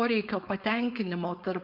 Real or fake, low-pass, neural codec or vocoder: fake; 5.4 kHz; codec, 16 kHz, 4.8 kbps, FACodec